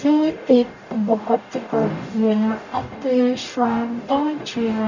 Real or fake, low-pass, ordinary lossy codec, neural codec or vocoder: fake; 7.2 kHz; none; codec, 44.1 kHz, 0.9 kbps, DAC